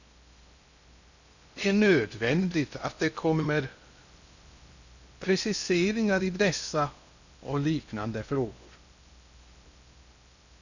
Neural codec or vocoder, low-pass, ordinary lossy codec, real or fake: codec, 16 kHz in and 24 kHz out, 0.6 kbps, FocalCodec, streaming, 2048 codes; 7.2 kHz; none; fake